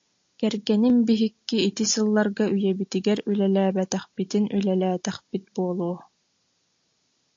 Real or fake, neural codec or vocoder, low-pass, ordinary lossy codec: real; none; 7.2 kHz; AAC, 48 kbps